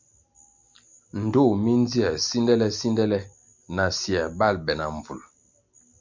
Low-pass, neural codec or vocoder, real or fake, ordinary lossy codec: 7.2 kHz; none; real; MP3, 64 kbps